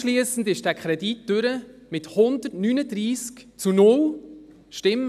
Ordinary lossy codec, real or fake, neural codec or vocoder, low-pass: none; real; none; 14.4 kHz